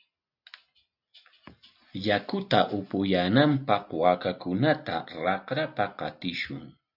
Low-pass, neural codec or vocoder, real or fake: 5.4 kHz; none; real